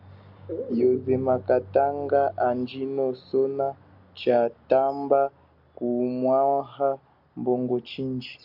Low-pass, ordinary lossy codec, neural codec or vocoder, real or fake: 5.4 kHz; MP3, 48 kbps; none; real